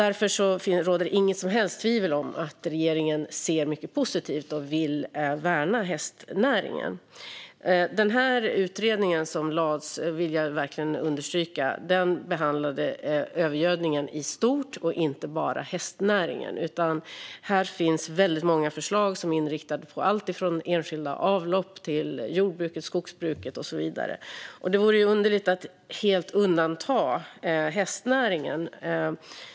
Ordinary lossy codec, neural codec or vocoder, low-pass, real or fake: none; none; none; real